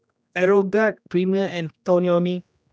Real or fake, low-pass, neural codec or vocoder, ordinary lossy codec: fake; none; codec, 16 kHz, 1 kbps, X-Codec, HuBERT features, trained on general audio; none